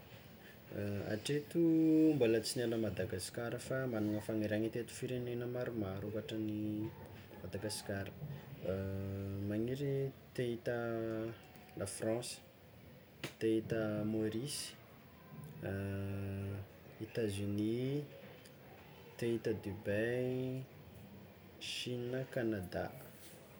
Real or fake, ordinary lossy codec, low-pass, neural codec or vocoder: real; none; none; none